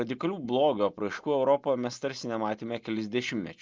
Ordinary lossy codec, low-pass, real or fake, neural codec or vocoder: Opus, 32 kbps; 7.2 kHz; real; none